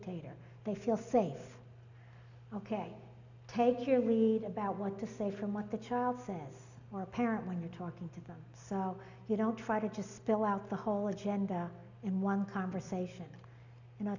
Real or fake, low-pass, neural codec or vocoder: real; 7.2 kHz; none